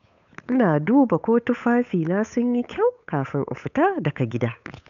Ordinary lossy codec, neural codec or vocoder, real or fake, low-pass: none; codec, 16 kHz, 8 kbps, FunCodec, trained on Chinese and English, 25 frames a second; fake; 7.2 kHz